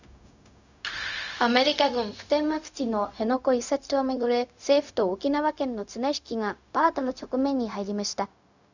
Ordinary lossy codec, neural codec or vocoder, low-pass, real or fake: none; codec, 16 kHz, 0.4 kbps, LongCat-Audio-Codec; 7.2 kHz; fake